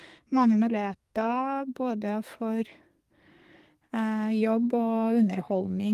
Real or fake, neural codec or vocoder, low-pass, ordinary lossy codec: fake; codec, 32 kHz, 1.9 kbps, SNAC; 14.4 kHz; Opus, 32 kbps